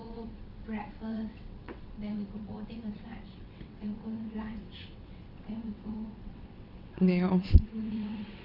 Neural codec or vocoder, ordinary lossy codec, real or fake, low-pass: vocoder, 22.05 kHz, 80 mel bands, WaveNeXt; none; fake; 5.4 kHz